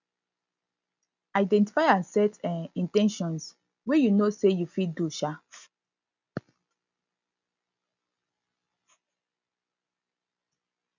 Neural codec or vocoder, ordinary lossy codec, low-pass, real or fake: none; none; 7.2 kHz; real